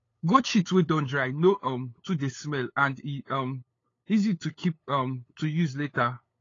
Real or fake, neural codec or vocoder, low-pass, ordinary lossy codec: fake; codec, 16 kHz, 8 kbps, FunCodec, trained on LibriTTS, 25 frames a second; 7.2 kHz; AAC, 32 kbps